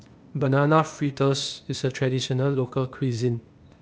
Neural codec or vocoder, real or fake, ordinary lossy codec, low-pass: codec, 16 kHz, 0.8 kbps, ZipCodec; fake; none; none